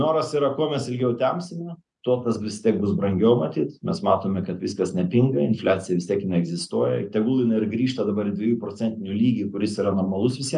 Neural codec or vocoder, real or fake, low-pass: none; real; 10.8 kHz